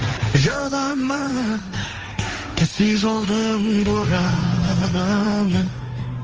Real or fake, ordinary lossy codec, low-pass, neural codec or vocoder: fake; Opus, 24 kbps; 7.2 kHz; codec, 16 kHz, 1.1 kbps, Voila-Tokenizer